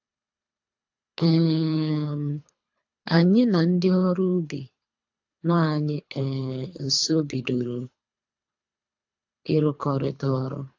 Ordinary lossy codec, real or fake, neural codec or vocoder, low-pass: AAC, 48 kbps; fake; codec, 24 kHz, 3 kbps, HILCodec; 7.2 kHz